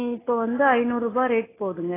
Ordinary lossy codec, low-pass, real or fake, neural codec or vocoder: AAC, 16 kbps; 3.6 kHz; real; none